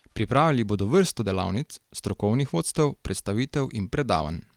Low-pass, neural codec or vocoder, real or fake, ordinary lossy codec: 19.8 kHz; vocoder, 44.1 kHz, 128 mel bands every 256 samples, BigVGAN v2; fake; Opus, 24 kbps